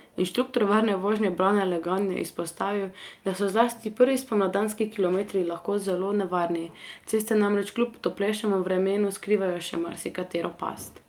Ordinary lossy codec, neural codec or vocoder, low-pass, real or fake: Opus, 32 kbps; none; 19.8 kHz; real